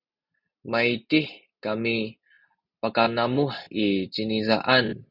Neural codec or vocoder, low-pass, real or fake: none; 5.4 kHz; real